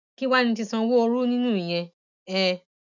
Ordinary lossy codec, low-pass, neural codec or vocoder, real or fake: none; 7.2 kHz; none; real